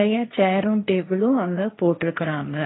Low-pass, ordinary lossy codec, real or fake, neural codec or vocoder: 7.2 kHz; AAC, 16 kbps; fake; codec, 16 kHz, 1.1 kbps, Voila-Tokenizer